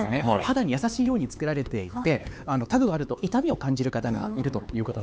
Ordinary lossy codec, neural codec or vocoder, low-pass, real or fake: none; codec, 16 kHz, 4 kbps, X-Codec, HuBERT features, trained on LibriSpeech; none; fake